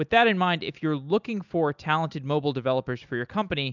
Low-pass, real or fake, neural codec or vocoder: 7.2 kHz; real; none